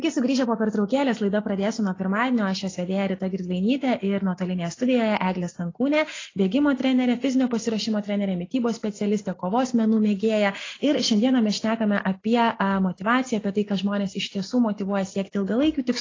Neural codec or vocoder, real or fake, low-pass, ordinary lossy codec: none; real; 7.2 kHz; AAC, 32 kbps